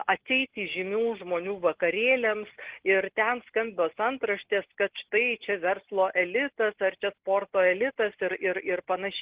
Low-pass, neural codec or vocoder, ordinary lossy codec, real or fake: 3.6 kHz; none; Opus, 16 kbps; real